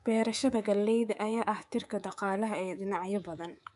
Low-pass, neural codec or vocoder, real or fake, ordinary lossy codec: 10.8 kHz; codec, 24 kHz, 3.1 kbps, DualCodec; fake; none